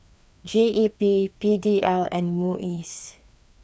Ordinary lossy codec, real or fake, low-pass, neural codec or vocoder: none; fake; none; codec, 16 kHz, 2 kbps, FreqCodec, larger model